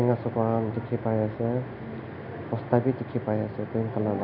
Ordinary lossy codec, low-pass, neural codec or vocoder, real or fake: none; 5.4 kHz; none; real